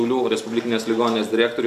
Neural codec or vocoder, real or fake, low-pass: none; real; 14.4 kHz